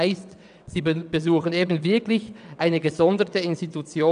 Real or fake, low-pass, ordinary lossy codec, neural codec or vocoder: fake; 9.9 kHz; none; vocoder, 22.05 kHz, 80 mel bands, WaveNeXt